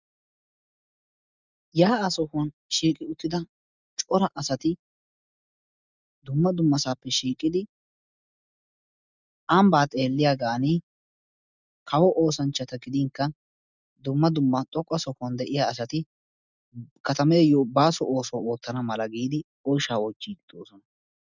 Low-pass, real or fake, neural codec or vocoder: 7.2 kHz; real; none